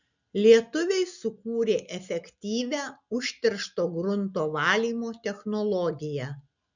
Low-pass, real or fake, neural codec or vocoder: 7.2 kHz; real; none